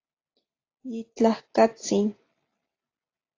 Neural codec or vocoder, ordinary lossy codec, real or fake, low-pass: none; AAC, 32 kbps; real; 7.2 kHz